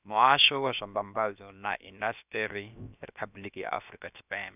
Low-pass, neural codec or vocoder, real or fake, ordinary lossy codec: 3.6 kHz; codec, 16 kHz, about 1 kbps, DyCAST, with the encoder's durations; fake; none